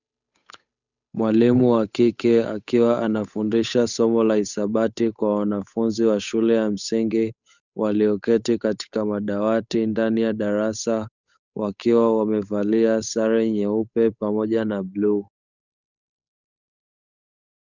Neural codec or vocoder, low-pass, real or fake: codec, 16 kHz, 8 kbps, FunCodec, trained on Chinese and English, 25 frames a second; 7.2 kHz; fake